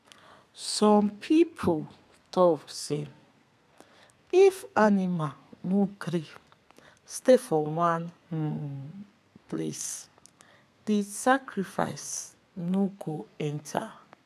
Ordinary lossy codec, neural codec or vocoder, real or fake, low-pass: none; codec, 32 kHz, 1.9 kbps, SNAC; fake; 14.4 kHz